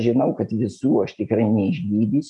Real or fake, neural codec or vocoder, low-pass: real; none; 10.8 kHz